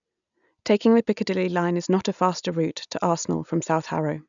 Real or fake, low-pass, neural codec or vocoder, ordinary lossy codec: real; 7.2 kHz; none; none